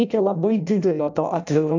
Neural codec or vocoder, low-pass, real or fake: codec, 16 kHz in and 24 kHz out, 0.6 kbps, FireRedTTS-2 codec; 7.2 kHz; fake